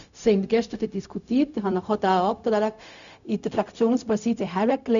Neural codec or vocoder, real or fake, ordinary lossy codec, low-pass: codec, 16 kHz, 0.4 kbps, LongCat-Audio-Codec; fake; none; 7.2 kHz